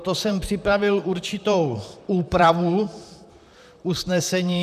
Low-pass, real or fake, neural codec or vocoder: 14.4 kHz; fake; vocoder, 44.1 kHz, 128 mel bands, Pupu-Vocoder